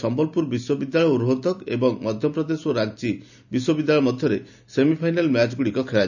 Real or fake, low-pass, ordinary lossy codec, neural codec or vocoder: real; 7.2 kHz; none; none